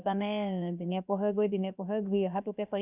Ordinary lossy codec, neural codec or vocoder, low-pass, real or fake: none; codec, 16 kHz, 0.7 kbps, FocalCodec; 3.6 kHz; fake